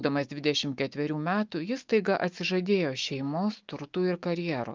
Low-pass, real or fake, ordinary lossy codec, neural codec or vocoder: 7.2 kHz; fake; Opus, 32 kbps; autoencoder, 48 kHz, 128 numbers a frame, DAC-VAE, trained on Japanese speech